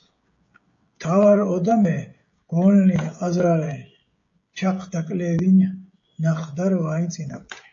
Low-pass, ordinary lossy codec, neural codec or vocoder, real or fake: 7.2 kHz; AAC, 48 kbps; codec, 16 kHz, 16 kbps, FreqCodec, smaller model; fake